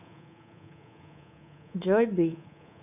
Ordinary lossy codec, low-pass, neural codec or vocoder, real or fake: none; 3.6 kHz; codec, 24 kHz, 3.1 kbps, DualCodec; fake